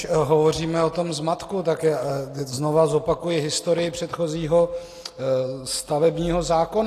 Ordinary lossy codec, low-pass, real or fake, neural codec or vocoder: AAC, 48 kbps; 14.4 kHz; real; none